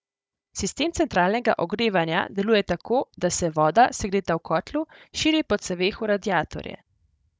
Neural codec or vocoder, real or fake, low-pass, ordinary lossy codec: codec, 16 kHz, 16 kbps, FunCodec, trained on Chinese and English, 50 frames a second; fake; none; none